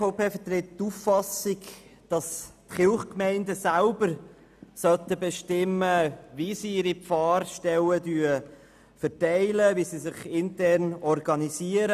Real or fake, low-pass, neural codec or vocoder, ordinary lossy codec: real; 14.4 kHz; none; none